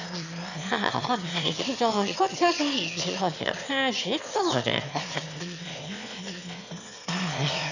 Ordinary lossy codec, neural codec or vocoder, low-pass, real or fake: none; autoencoder, 22.05 kHz, a latent of 192 numbers a frame, VITS, trained on one speaker; 7.2 kHz; fake